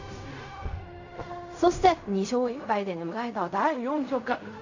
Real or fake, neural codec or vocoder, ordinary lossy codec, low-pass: fake; codec, 16 kHz in and 24 kHz out, 0.4 kbps, LongCat-Audio-Codec, fine tuned four codebook decoder; AAC, 48 kbps; 7.2 kHz